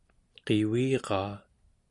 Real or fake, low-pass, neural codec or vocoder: real; 10.8 kHz; none